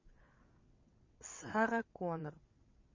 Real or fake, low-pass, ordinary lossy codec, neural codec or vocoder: fake; 7.2 kHz; MP3, 32 kbps; vocoder, 22.05 kHz, 80 mel bands, WaveNeXt